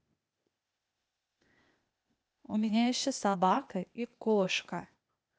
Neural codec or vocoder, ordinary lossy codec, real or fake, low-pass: codec, 16 kHz, 0.8 kbps, ZipCodec; none; fake; none